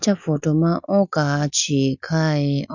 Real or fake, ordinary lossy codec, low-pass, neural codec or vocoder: real; none; 7.2 kHz; none